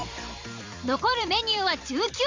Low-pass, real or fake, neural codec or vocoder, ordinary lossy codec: 7.2 kHz; fake; vocoder, 44.1 kHz, 128 mel bands every 256 samples, BigVGAN v2; MP3, 64 kbps